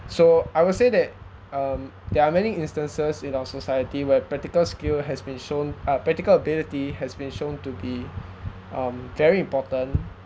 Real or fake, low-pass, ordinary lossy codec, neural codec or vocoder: real; none; none; none